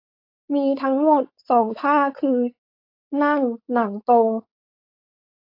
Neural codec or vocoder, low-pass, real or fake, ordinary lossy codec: codec, 16 kHz, 4.8 kbps, FACodec; 5.4 kHz; fake; AAC, 32 kbps